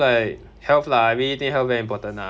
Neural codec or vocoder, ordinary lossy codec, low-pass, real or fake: none; none; none; real